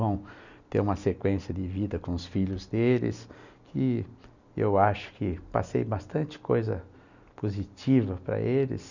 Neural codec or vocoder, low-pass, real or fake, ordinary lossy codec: none; 7.2 kHz; real; none